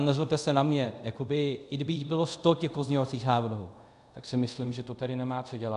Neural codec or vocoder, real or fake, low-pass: codec, 24 kHz, 0.5 kbps, DualCodec; fake; 10.8 kHz